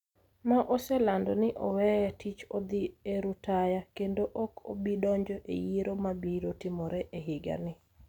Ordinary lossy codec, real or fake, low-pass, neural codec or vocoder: none; real; 19.8 kHz; none